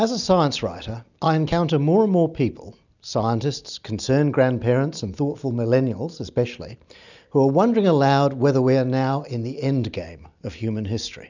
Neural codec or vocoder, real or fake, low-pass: none; real; 7.2 kHz